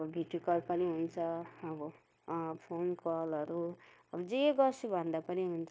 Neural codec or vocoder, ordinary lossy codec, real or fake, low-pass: codec, 16 kHz, 0.9 kbps, LongCat-Audio-Codec; none; fake; none